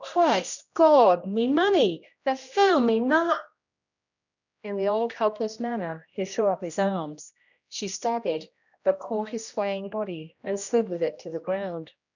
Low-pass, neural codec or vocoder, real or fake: 7.2 kHz; codec, 16 kHz, 1 kbps, X-Codec, HuBERT features, trained on general audio; fake